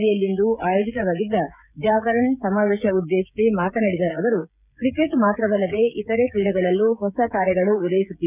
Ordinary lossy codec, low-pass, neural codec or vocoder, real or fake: none; 3.6 kHz; codec, 16 kHz, 16 kbps, FreqCodec, smaller model; fake